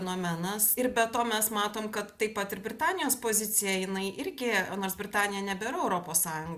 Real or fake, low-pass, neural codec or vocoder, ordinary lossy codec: real; 14.4 kHz; none; Opus, 64 kbps